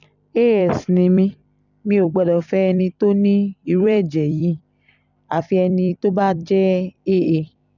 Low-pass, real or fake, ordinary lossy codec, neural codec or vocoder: 7.2 kHz; fake; none; vocoder, 44.1 kHz, 128 mel bands every 256 samples, BigVGAN v2